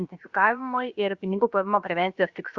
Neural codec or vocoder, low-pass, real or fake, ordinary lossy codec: codec, 16 kHz, about 1 kbps, DyCAST, with the encoder's durations; 7.2 kHz; fake; Opus, 64 kbps